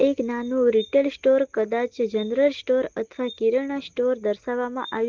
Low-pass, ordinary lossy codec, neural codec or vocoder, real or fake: 7.2 kHz; Opus, 16 kbps; none; real